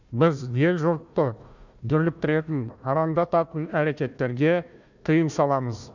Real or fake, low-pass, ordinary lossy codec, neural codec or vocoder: fake; 7.2 kHz; none; codec, 16 kHz, 1 kbps, FunCodec, trained on Chinese and English, 50 frames a second